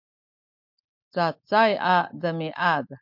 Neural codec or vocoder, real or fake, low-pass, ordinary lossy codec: none; real; 5.4 kHz; MP3, 48 kbps